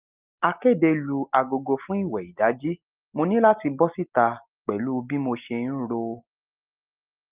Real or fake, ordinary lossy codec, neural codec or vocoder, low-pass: real; Opus, 32 kbps; none; 3.6 kHz